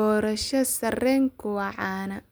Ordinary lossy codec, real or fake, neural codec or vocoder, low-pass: none; real; none; none